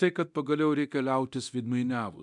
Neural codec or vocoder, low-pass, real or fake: codec, 24 kHz, 0.9 kbps, DualCodec; 10.8 kHz; fake